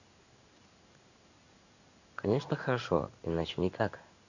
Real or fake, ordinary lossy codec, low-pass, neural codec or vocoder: fake; none; 7.2 kHz; codec, 16 kHz in and 24 kHz out, 1 kbps, XY-Tokenizer